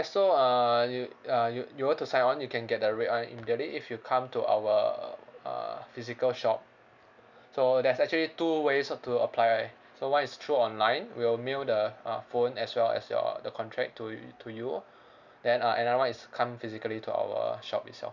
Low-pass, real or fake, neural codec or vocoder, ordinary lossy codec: 7.2 kHz; real; none; none